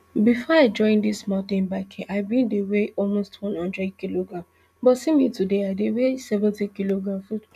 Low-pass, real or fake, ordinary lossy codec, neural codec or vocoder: 14.4 kHz; real; none; none